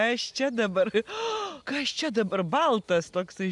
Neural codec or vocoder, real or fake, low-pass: none; real; 10.8 kHz